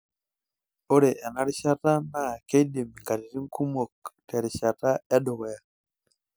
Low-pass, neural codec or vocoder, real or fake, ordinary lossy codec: none; none; real; none